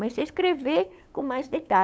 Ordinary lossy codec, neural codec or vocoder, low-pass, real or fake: none; codec, 16 kHz, 8 kbps, FunCodec, trained on LibriTTS, 25 frames a second; none; fake